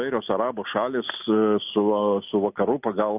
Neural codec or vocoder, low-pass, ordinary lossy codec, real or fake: none; 3.6 kHz; AAC, 32 kbps; real